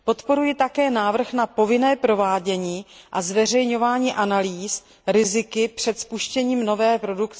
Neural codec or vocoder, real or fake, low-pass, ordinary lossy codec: none; real; none; none